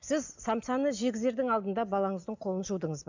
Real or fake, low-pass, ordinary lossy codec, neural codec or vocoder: real; 7.2 kHz; none; none